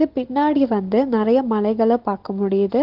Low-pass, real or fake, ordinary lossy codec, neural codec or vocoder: 5.4 kHz; real; Opus, 16 kbps; none